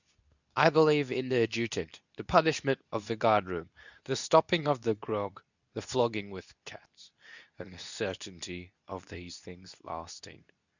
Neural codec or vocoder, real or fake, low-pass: codec, 24 kHz, 0.9 kbps, WavTokenizer, medium speech release version 2; fake; 7.2 kHz